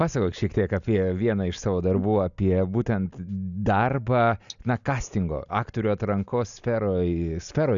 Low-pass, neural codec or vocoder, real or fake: 7.2 kHz; none; real